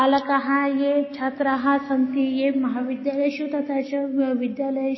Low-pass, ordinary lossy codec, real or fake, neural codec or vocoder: 7.2 kHz; MP3, 24 kbps; real; none